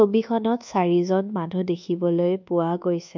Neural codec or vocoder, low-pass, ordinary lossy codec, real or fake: codec, 24 kHz, 1.2 kbps, DualCodec; 7.2 kHz; MP3, 64 kbps; fake